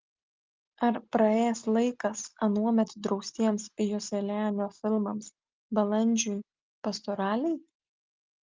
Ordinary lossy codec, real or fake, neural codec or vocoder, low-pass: Opus, 24 kbps; real; none; 7.2 kHz